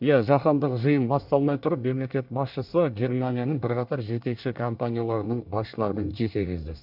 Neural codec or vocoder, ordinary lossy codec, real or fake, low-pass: codec, 24 kHz, 1 kbps, SNAC; none; fake; 5.4 kHz